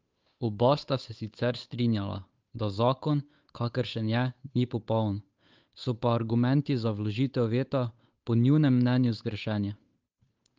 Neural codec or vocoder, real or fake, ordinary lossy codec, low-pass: codec, 16 kHz, 8 kbps, FunCodec, trained on Chinese and English, 25 frames a second; fake; Opus, 24 kbps; 7.2 kHz